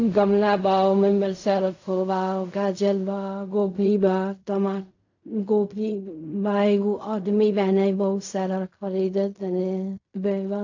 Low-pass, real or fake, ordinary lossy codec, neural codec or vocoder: 7.2 kHz; fake; AAC, 48 kbps; codec, 16 kHz in and 24 kHz out, 0.4 kbps, LongCat-Audio-Codec, fine tuned four codebook decoder